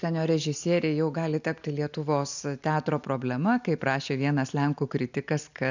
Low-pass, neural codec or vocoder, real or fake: 7.2 kHz; none; real